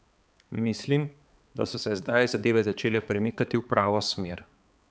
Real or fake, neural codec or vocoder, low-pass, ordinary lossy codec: fake; codec, 16 kHz, 4 kbps, X-Codec, HuBERT features, trained on balanced general audio; none; none